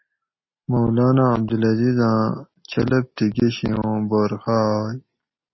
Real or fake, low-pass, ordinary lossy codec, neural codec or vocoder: real; 7.2 kHz; MP3, 24 kbps; none